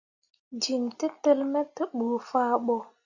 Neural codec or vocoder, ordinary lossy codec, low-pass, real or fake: none; Opus, 64 kbps; 7.2 kHz; real